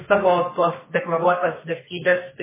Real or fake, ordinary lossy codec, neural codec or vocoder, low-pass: fake; MP3, 16 kbps; codec, 32 kHz, 1.9 kbps, SNAC; 3.6 kHz